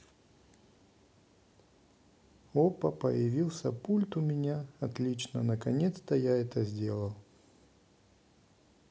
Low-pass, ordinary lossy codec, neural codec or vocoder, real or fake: none; none; none; real